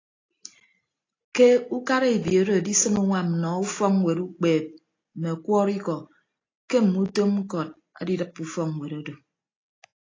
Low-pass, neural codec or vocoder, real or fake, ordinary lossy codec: 7.2 kHz; none; real; AAC, 32 kbps